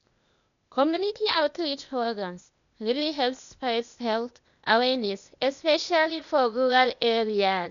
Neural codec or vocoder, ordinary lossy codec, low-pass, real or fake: codec, 16 kHz, 0.8 kbps, ZipCodec; none; 7.2 kHz; fake